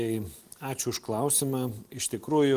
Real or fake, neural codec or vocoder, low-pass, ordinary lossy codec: real; none; 14.4 kHz; Opus, 24 kbps